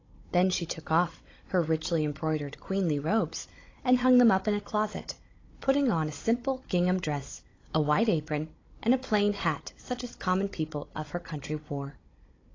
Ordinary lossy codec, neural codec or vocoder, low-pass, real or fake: AAC, 32 kbps; codec, 16 kHz, 16 kbps, FunCodec, trained on Chinese and English, 50 frames a second; 7.2 kHz; fake